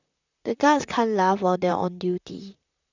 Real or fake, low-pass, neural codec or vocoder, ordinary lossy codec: fake; 7.2 kHz; vocoder, 44.1 kHz, 128 mel bands, Pupu-Vocoder; none